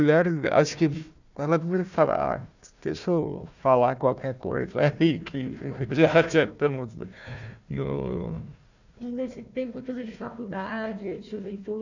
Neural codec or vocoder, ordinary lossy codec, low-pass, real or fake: codec, 16 kHz, 1 kbps, FunCodec, trained on Chinese and English, 50 frames a second; none; 7.2 kHz; fake